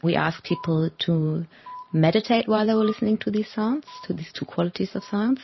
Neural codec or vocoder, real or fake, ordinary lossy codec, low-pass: vocoder, 44.1 kHz, 128 mel bands every 512 samples, BigVGAN v2; fake; MP3, 24 kbps; 7.2 kHz